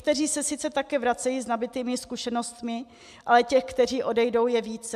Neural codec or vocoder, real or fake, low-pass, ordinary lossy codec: none; real; 14.4 kHz; MP3, 96 kbps